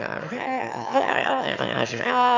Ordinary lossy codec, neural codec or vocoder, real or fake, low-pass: MP3, 64 kbps; autoencoder, 22.05 kHz, a latent of 192 numbers a frame, VITS, trained on one speaker; fake; 7.2 kHz